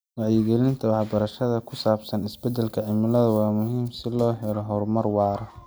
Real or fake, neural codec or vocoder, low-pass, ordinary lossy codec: real; none; none; none